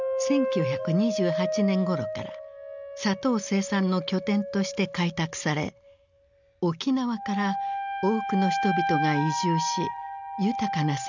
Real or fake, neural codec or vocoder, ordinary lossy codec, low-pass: real; none; none; 7.2 kHz